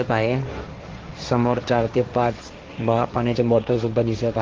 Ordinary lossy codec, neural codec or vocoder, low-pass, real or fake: Opus, 16 kbps; codec, 16 kHz, 1.1 kbps, Voila-Tokenizer; 7.2 kHz; fake